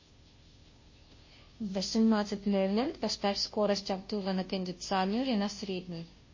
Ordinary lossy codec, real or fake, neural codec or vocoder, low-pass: MP3, 32 kbps; fake; codec, 16 kHz, 0.5 kbps, FunCodec, trained on Chinese and English, 25 frames a second; 7.2 kHz